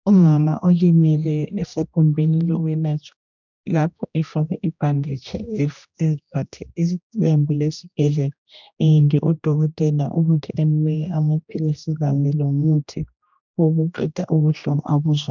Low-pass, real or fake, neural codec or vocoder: 7.2 kHz; fake; codec, 16 kHz, 1 kbps, X-Codec, HuBERT features, trained on general audio